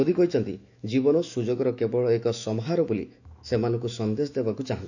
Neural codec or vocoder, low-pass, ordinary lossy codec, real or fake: autoencoder, 48 kHz, 128 numbers a frame, DAC-VAE, trained on Japanese speech; 7.2 kHz; none; fake